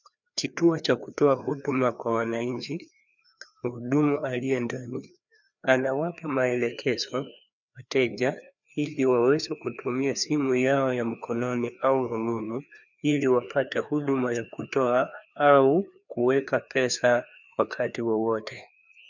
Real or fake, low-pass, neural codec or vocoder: fake; 7.2 kHz; codec, 16 kHz, 2 kbps, FreqCodec, larger model